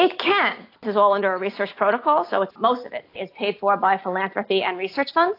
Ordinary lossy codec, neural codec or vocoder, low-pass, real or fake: AAC, 32 kbps; none; 5.4 kHz; real